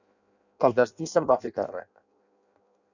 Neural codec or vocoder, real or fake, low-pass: codec, 16 kHz in and 24 kHz out, 0.6 kbps, FireRedTTS-2 codec; fake; 7.2 kHz